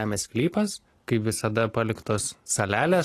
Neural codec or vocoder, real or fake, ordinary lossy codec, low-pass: none; real; AAC, 48 kbps; 14.4 kHz